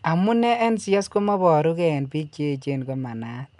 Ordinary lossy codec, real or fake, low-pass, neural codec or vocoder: none; real; 10.8 kHz; none